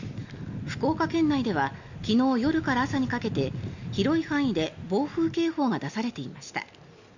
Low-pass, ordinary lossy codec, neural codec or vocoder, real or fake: 7.2 kHz; none; none; real